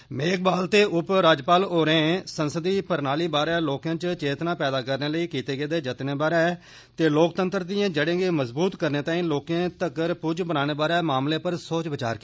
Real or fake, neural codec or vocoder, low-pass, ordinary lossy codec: real; none; none; none